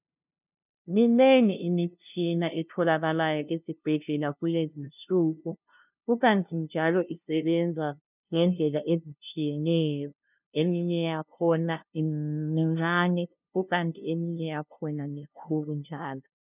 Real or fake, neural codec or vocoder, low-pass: fake; codec, 16 kHz, 0.5 kbps, FunCodec, trained on LibriTTS, 25 frames a second; 3.6 kHz